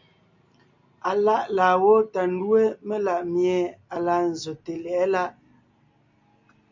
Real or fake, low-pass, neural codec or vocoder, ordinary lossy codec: real; 7.2 kHz; none; MP3, 48 kbps